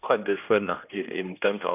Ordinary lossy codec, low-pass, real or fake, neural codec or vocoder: none; 3.6 kHz; fake; codec, 16 kHz, 1 kbps, X-Codec, HuBERT features, trained on general audio